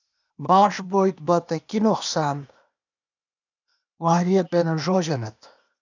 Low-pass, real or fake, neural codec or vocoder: 7.2 kHz; fake; codec, 16 kHz, 0.8 kbps, ZipCodec